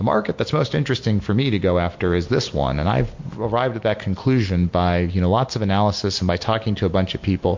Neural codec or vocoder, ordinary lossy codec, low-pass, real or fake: none; MP3, 48 kbps; 7.2 kHz; real